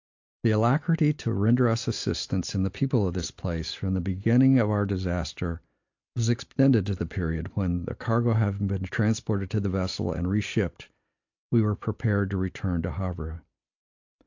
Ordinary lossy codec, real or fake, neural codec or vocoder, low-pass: AAC, 48 kbps; real; none; 7.2 kHz